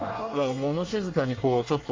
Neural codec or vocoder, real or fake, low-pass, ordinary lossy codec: codec, 24 kHz, 1 kbps, SNAC; fake; 7.2 kHz; Opus, 32 kbps